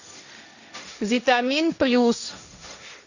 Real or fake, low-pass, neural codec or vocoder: fake; 7.2 kHz; codec, 16 kHz, 1.1 kbps, Voila-Tokenizer